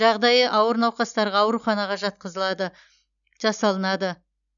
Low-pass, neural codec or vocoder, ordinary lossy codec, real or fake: 7.2 kHz; none; none; real